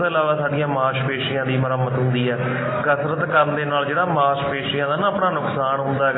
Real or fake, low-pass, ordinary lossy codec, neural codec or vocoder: real; 7.2 kHz; AAC, 16 kbps; none